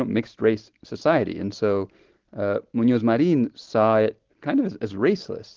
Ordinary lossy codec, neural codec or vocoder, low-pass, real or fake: Opus, 16 kbps; none; 7.2 kHz; real